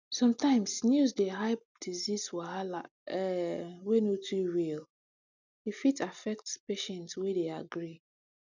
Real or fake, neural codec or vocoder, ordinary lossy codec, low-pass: real; none; none; 7.2 kHz